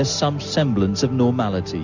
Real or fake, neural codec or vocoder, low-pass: real; none; 7.2 kHz